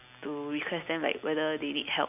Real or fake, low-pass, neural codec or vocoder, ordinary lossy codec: real; 3.6 kHz; none; none